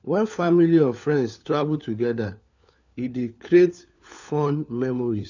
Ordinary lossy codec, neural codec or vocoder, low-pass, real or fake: none; codec, 16 kHz, 4 kbps, FunCodec, trained on LibriTTS, 50 frames a second; 7.2 kHz; fake